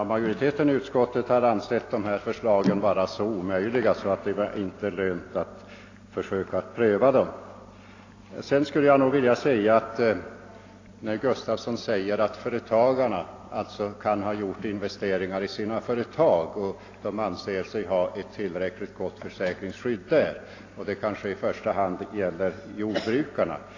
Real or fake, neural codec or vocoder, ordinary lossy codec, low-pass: real; none; AAC, 32 kbps; 7.2 kHz